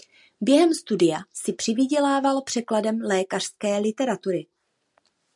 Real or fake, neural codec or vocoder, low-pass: real; none; 10.8 kHz